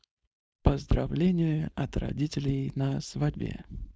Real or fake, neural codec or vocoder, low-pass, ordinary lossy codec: fake; codec, 16 kHz, 4.8 kbps, FACodec; none; none